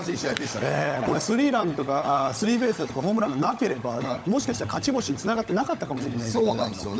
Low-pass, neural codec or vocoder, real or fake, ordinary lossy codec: none; codec, 16 kHz, 16 kbps, FunCodec, trained on LibriTTS, 50 frames a second; fake; none